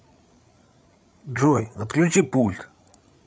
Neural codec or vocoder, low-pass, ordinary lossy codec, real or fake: codec, 16 kHz, 8 kbps, FreqCodec, larger model; none; none; fake